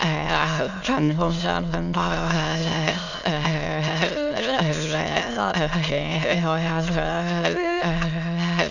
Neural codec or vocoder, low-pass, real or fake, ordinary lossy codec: autoencoder, 22.05 kHz, a latent of 192 numbers a frame, VITS, trained on many speakers; 7.2 kHz; fake; none